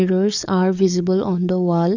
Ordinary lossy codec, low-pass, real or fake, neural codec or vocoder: none; 7.2 kHz; fake; codec, 44.1 kHz, 7.8 kbps, DAC